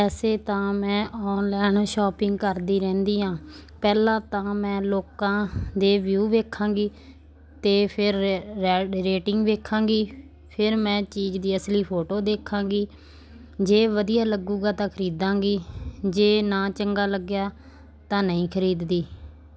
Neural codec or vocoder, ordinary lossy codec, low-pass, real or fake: none; none; none; real